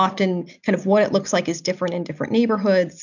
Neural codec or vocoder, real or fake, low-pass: none; real; 7.2 kHz